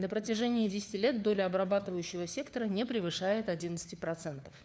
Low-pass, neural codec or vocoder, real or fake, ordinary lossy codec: none; codec, 16 kHz, 4 kbps, FunCodec, trained on LibriTTS, 50 frames a second; fake; none